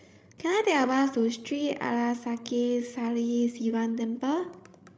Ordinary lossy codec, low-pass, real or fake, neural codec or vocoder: none; none; fake; codec, 16 kHz, 16 kbps, FreqCodec, larger model